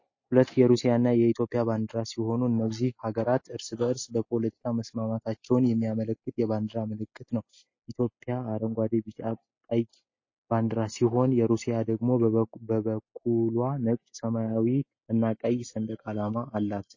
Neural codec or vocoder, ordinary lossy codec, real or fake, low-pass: none; MP3, 32 kbps; real; 7.2 kHz